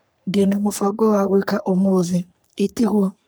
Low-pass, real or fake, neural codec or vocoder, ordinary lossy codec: none; fake; codec, 44.1 kHz, 3.4 kbps, Pupu-Codec; none